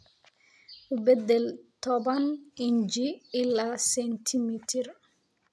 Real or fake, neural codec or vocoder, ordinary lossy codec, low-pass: real; none; none; none